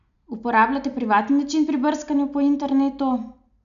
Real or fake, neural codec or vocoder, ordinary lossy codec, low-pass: real; none; none; 7.2 kHz